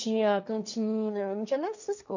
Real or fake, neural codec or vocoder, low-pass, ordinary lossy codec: fake; codec, 16 kHz, 1.1 kbps, Voila-Tokenizer; 7.2 kHz; none